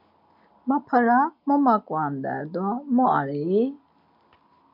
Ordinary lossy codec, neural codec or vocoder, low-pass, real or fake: MP3, 48 kbps; none; 5.4 kHz; real